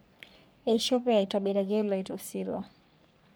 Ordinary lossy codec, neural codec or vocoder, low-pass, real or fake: none; codec, 44.1 kHz, 3.4 kbps, Pupu-Codec; none; fake